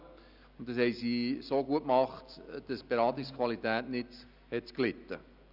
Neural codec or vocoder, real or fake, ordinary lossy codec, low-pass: none; real; none; 5.4 kHz